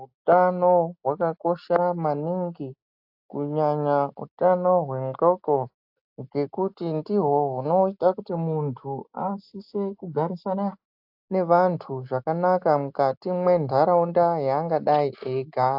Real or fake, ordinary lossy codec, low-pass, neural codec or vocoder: real; AAC, 48 kbps; 5.4 kHz; none